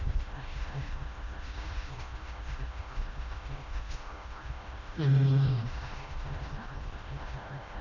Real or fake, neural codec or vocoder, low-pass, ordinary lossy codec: fake; codec, 16 kHz, 1 kbps, FreqCodec, smaller model; 7.2 kHz; none